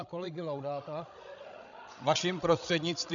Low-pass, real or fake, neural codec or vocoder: 7.2 kHz; fake; codec, 16 kHz, 16 kbps, FreqCodec, larger model